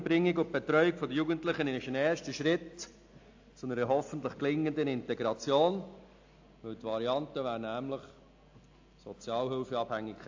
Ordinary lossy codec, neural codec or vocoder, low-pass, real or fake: AAC, 48 kbps; none; 7.2 kHz; real